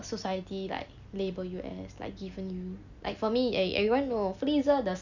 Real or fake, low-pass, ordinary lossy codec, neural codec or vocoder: real; 7.2 kHz; none; none